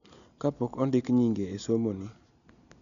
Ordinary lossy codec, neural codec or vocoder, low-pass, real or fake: none; none; 7.2 kHz; real